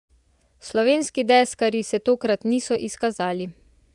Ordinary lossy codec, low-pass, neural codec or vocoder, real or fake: none; 10.8 kHz; vocoder, 24 kHz, 100 mel bands, Vocos; fake